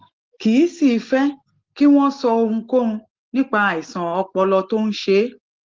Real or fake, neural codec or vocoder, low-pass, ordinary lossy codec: real; none; 7.2 kHz; Opus, 16 kbps